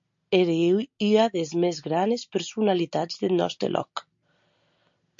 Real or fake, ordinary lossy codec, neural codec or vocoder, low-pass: real; MP3, 48 kbps; none; 7.2 kHz